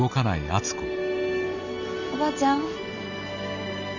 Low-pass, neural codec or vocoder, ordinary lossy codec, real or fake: 7.2 kHz; none; none; real